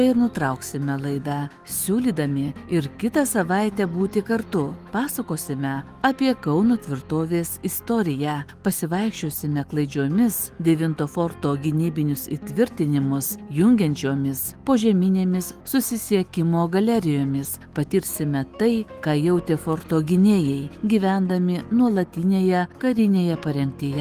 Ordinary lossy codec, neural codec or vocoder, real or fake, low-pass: Opus, 24 kbps; autoencoder, 48 kHz, 128 numbers a frame, DAC-VAE, trained on Japanese speech; fake; 14.4 kHz